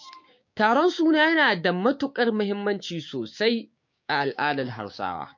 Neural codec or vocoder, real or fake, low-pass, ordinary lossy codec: codec, 16 kHz, 6 kbps, DAC; fake; 7.2 kHz; MP3, 48 kbps